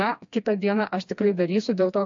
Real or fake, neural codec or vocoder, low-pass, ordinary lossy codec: fake; codec, 16 kHz, 2 kbps, FreqCodec, smaller model; 7.2 kHz; AAC, 64 kbps